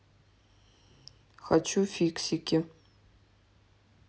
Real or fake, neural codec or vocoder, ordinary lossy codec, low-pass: real; none; none; none